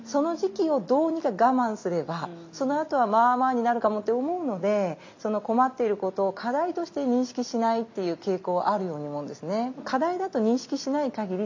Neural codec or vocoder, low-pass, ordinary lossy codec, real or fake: none; 7.2 kHz; MP3, 48 kbps; real